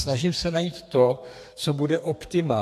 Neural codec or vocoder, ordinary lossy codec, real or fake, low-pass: codec, 32 kHz, 1.9 kbps, SNAC; AAC, 64 kbps; fake; 14.4 kHz